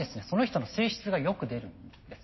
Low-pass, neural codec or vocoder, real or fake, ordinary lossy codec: 7.2 kHz; none; real; MP3, 24 kbps